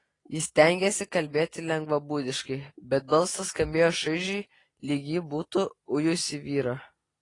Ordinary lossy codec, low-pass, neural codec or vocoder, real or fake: AAC, 32 kbps; 10.8 kHz; none; real